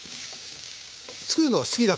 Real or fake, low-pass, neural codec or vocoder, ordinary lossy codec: fake; none; codec, 16 kHz, 6 kbps, DAC; none